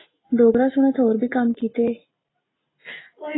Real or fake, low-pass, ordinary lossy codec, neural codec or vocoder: real; 7.2 kHz; AAC, 16 kbps; none